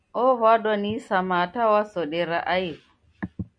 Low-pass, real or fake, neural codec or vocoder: 9.9 kHz; real; none